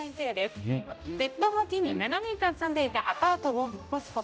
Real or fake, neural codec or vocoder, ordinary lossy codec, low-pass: fake; codec, 16 kHz, 0.5 kbps, X-Codec, HuBERT features, trained on general audio; none; none